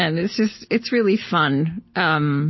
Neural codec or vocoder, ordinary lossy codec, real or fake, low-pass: none; MP3, 24 kbps; real; 7.2 kHz